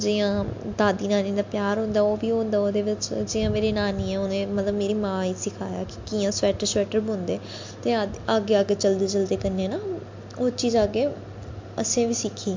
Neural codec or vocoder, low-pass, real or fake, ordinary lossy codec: none; 7.2 kHz; real; MP3, 48 kbps